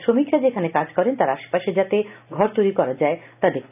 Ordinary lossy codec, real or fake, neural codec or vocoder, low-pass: none; real; none; 3.6 kHz